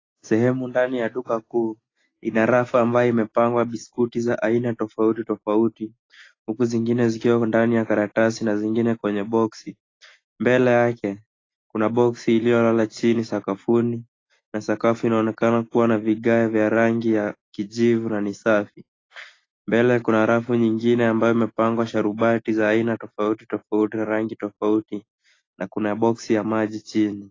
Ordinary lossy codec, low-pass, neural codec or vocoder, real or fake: AAC, 32 kbps; 7.2 kHz; none; real